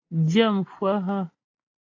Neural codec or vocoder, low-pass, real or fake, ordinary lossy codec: none; 7.2 kHz; real; AAC, 32 kbps